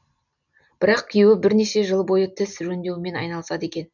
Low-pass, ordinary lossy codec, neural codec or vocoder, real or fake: 7.2 kHz; none; none; real